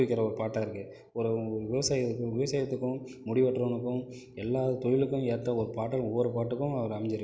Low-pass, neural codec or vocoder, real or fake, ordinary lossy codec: none; none; real; none